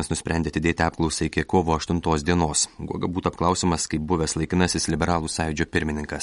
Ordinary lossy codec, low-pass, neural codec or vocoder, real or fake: MP3, 48 kbps; 19.8 kHz; none; real